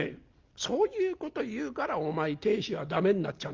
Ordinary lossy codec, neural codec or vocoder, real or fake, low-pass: Opus, 24 kbps; none; real; 7.2 kHz